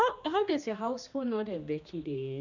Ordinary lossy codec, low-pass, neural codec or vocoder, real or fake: none; 7.2 kHz; codec, 16 kHz, 2 kbps, X-Codec, HuBERT features, trained on general audio; fake